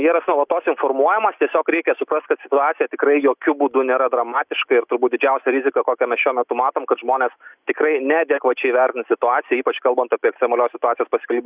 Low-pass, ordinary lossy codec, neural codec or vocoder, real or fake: 3.6 kHz; Opus, 64 kbps; none; real